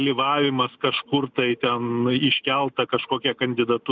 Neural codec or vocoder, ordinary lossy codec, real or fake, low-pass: none; Opus, 64 kbps; real; 7.2 kHz